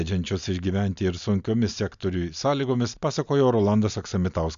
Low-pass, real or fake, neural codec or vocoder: 7.2 kHz; real; none